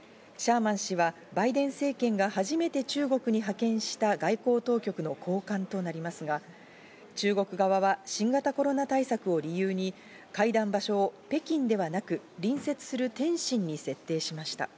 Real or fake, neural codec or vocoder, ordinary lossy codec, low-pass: real; none; none; none